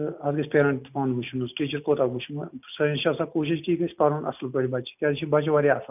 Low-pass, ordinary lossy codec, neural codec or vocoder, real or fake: 3.6 kHz; none; none; real